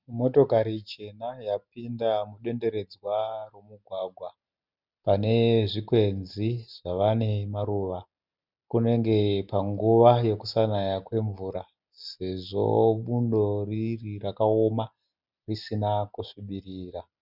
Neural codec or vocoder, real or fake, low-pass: none; real; 5.4 kHz